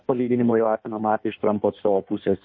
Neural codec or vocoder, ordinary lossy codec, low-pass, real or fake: codec, 16 kHz in and 24 kHz out, 1.1 kbps, FireRedTTS-2 codec; MP3, 32 kbps; 7.2 kHz; fake